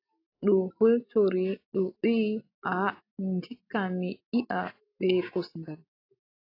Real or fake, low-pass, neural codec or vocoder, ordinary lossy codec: real; 5.4 kHz; none; AAC, 32 kbps